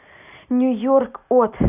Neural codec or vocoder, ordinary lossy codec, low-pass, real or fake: none; none; 3.6 kHz; real